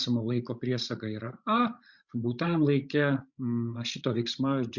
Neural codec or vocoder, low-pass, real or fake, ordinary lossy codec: codec, 16 kHz, 16 kbps, FreqCodec, larger model; 7.2 kHz; fake; Opus, 64 kbps